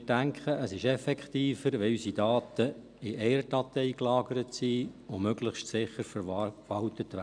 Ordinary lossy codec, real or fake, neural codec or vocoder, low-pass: none; real; none; 9.9 kHz